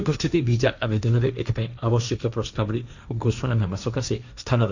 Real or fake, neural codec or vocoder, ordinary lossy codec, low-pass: fake; codec, 16 kHz, 1.1 kbps, Voila-Tokenizer; none; 7.2 kHz